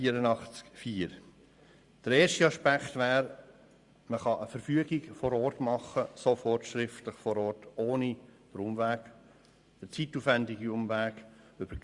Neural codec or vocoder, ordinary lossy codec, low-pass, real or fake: vocoder, 44.1 kHz, 128 mel bands every 512 samples, BigVGAN v2; Opus, 64 kbps; 10.8 kHz; fake